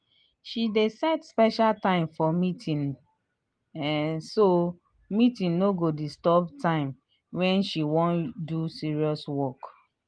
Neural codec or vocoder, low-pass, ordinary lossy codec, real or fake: none; 9.9 kHz; Opus, 24 kbps; real